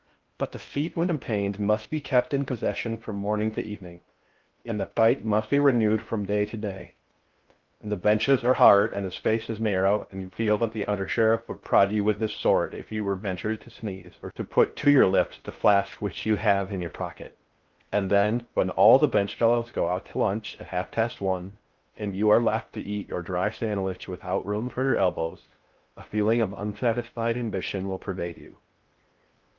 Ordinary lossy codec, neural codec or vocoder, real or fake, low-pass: Opus, 32 kbps; codec, 16 kHz in and 24 kHz out, 0.6 kbps, FocalCodec, streaming, 2048 codes; fake; 7.2 kHz